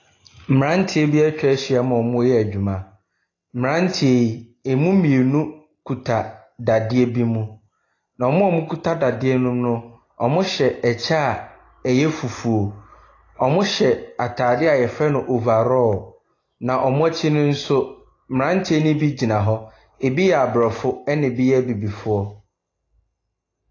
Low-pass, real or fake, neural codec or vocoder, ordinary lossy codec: 7.2 kHz; real; none; AAC, 32 kbps